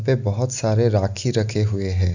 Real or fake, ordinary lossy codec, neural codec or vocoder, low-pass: real; none; none; 7.2 kHz